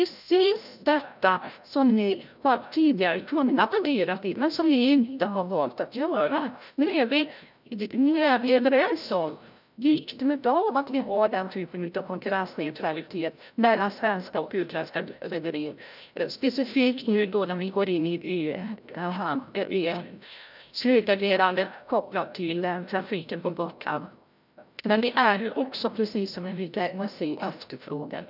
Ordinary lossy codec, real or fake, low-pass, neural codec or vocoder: none; fake; 5.4 kHz; codec, 16 kHz, 0.5 kbps, FreqCodec, larger model